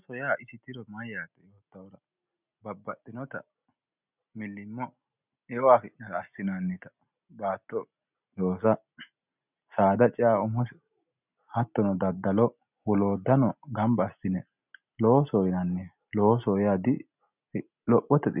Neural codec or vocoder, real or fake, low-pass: none; real; 3.6 kHz